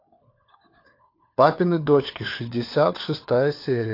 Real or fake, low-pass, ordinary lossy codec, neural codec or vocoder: fake; 5.4 kHz; AAC, 32 kbps; codec, 16 kHz, 4 kbps, FunCodec, trained on LibriTTS, 50 frames a second